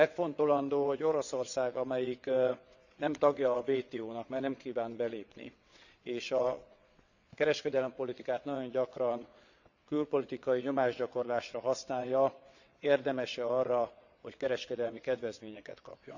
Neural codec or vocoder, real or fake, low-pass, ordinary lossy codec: vocoder, 22.05 kHz, 80 mel bands, WaveNeXt; fake; 7.2 kHz; none